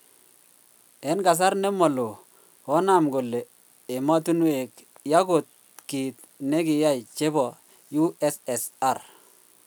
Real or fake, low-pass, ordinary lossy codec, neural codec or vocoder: real; none; none; none